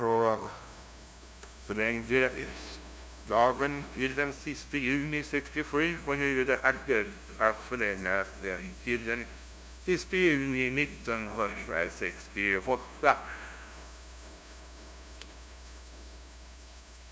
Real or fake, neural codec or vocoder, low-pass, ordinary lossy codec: fake; codec, 16 kHz, 0.5 kbps, FunCodec, trained on LibriTTS, 25 frames a second; none; none